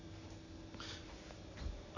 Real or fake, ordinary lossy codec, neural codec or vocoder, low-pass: real; none; none; 7.2 kHz